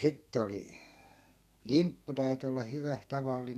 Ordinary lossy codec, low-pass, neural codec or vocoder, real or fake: none; 14.4 kHz; codec, 32 kHz, 1.9 kbps, SNAC; fake